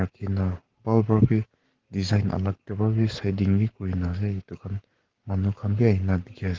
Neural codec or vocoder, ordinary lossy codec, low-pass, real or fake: codec, 44.1 kHz, 7.8 kbps, Pupu-Codec; Opus, 16 kbps; 7.2 kHz; fake